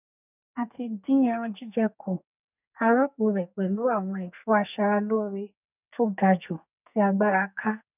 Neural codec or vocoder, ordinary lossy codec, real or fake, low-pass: codec, 44.1 kHz, 2.6 kbps, SNAC; none; fake; 3.6 kHz